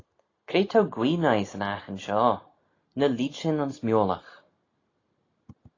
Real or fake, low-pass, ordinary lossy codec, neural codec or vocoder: real; 7.2 kHz; AAC, 32 kbps; none